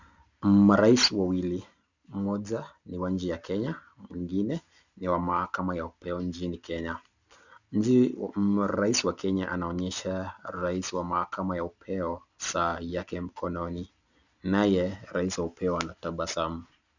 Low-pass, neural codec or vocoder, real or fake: 7.2 kHz; none; real